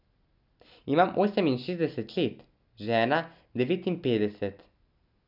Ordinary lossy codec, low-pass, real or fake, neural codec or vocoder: none; 5.4 kHz; real; none